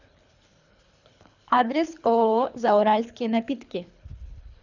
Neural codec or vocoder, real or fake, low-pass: codec, 24 kHz, 3 kbps, HILCodec; fake; 7.2 kHz